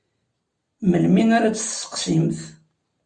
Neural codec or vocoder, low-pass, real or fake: none; 9.9 kHz; real